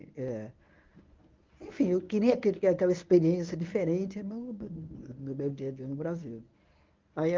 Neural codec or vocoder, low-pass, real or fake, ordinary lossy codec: codec, 24 kHz, 0.9 kbps, WavTokenizer, medium speech release version 1; 7.2 kHz; fake; Opus, 24 kbps